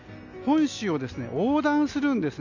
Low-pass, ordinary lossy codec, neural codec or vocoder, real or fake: 7.2 kHz; none; none; real